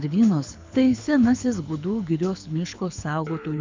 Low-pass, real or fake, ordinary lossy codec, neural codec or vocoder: 7.2 kHz; fake; MP3, 64 kbps; codec, 24 kHz, 6 kbps, HILCodec